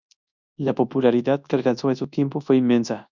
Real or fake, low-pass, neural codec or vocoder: fake; 7.2 kHz; codec, 24 kHz, 0.9 kbps, WavTokenizer, large speech release